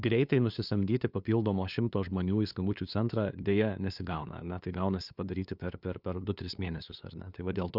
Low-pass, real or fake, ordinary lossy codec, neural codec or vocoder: 5.4 kHz; fake; Opus, 64 kbps; codec, 16 kHz, 2 kbps, FunCodec, trained on LibriTTS, 25 frames a second